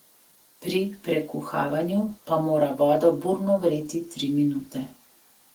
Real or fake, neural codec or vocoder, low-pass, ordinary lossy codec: real; none; 19.8 kHz; Opus, 16 kbps